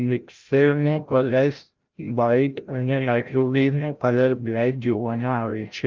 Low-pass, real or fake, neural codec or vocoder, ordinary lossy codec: 7.2 kHz; fake; codec, 16 kHz, 0.5 kbps, FreqCodec, larger model; Opus, 32 kbps